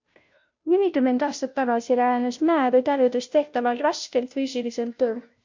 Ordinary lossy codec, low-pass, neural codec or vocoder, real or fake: MP3, 48 kbps; 7.2 kHz; codec, 16 kHz, 0.5 kbps, FunCodec, trained on Chinese and English, 25 frames a second; fake